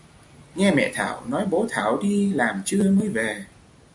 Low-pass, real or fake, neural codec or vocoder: 10.8 kHz; real; none